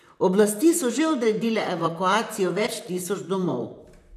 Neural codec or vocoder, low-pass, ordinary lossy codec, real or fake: vocoder, 44.1 kHz, 128 mel bands, Pupu-Vocoder; 14.4 kHz; none; fake